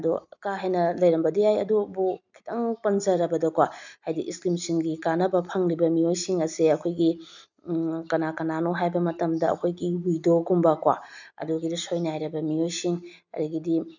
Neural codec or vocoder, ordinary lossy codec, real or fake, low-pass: none; none; real; 7.2 kHz